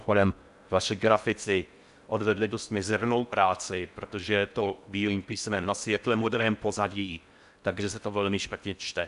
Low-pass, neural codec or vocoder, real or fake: 10.8 kHz; codec, 16 kHz in and 24 kHz out, 0.6 kbps, FocalCodec, streaming, 4096 codes; fake